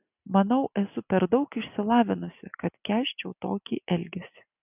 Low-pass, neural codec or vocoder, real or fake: 3.6 kHz; none; real